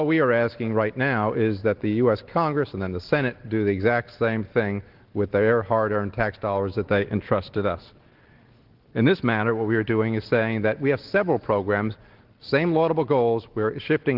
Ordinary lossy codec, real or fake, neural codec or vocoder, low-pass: Opus, 16 kbps; real; none; 5.4 kHz